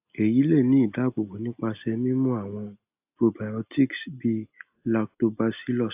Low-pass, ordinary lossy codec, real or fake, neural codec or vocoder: 3.6 kHz; AAC, 32 kbps; real; none